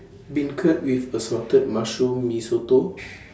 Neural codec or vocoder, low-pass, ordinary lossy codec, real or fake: none; none; none; real